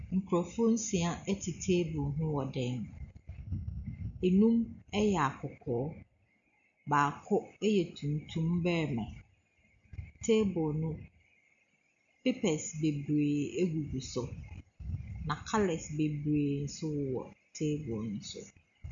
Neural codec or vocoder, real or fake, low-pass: none; real; 7.2 kHz